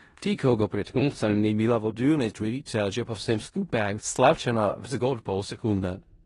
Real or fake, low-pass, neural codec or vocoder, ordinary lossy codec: fake; 10.8 kHz; codec, 16 kHz in and 24 kHz out, 0.4 kbps, LongCat-Audio-Codec, four codebook decoder; AAC, 32 kbps